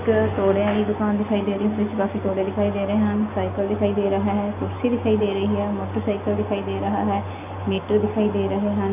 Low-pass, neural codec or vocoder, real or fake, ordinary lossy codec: 3.6 kHz; none; real; MP3, 32 kbps